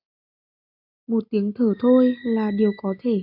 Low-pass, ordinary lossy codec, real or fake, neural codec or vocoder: 5.4 kHz; MP3, 48 kbps; real; none